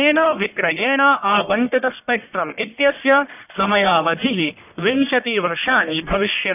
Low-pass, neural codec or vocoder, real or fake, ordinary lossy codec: 3.6 kHz; codec, 44.1 kHz, 1.7 kbps, Pupu-Codec; fake; none